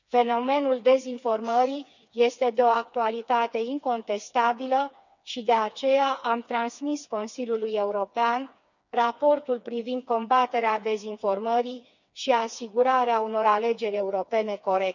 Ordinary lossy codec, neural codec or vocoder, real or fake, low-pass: none; codec, 16 kHz, 4 kbps, FreqCodec, smaller model; fake; 7.2 kHz